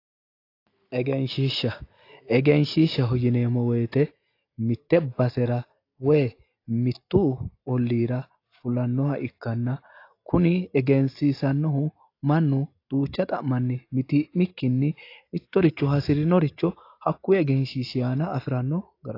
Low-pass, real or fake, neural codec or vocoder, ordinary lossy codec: 5.4 kHz; real; none; AAC, 32 kbps